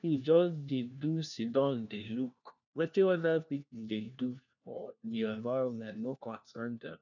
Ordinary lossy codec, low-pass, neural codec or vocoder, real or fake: none; 7.2 kHz; codec, 16 kHz, 1 kbps, FunCodec, trained on LibriTTS, 50 frames a second; fake